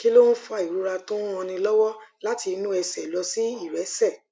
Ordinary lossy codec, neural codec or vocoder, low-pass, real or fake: none; none; none; real